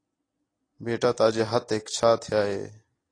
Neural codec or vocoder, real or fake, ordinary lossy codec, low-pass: none; real; AAC, 32 kbps; 9.9 kHz